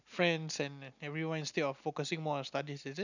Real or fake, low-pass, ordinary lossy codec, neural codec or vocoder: real; 7.2 kHz; none; none